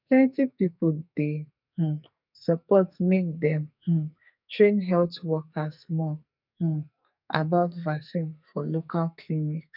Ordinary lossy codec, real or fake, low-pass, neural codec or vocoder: none; fake; 5.4 kHz; codec, 44.1 kHz, 2.6 kbps, SNAC